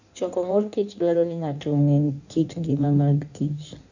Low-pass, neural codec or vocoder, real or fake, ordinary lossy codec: 7.2 kHz; codec, 16 kHz in and 24 kHz out, 1.1 kbps, FireRedTTS-2 codec; fake; none